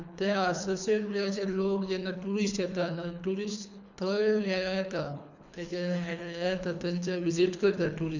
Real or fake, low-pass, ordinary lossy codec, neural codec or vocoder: fake; 7.2 kHz; none; codec, 24 kHz, 3 kbps, HILCodec